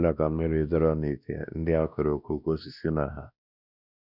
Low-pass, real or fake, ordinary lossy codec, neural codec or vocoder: 5.4 kHz; fake; none; codec, 16 kHz, 1 kbps, X-Codec, WavLM features, trained on Multilingual LibriSpeech